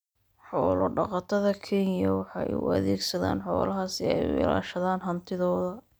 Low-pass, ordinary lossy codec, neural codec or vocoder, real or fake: none; none; none; real